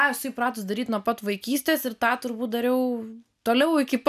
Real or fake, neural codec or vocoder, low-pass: real; none; 14.4 kHz